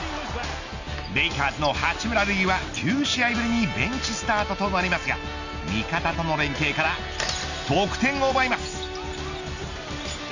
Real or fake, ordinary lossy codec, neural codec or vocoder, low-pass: real; Opus, 64 kbps; none; 7.2 kHz